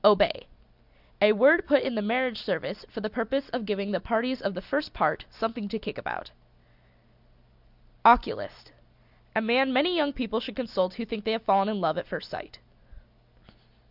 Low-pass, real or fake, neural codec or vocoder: 5.4 kHz; real; none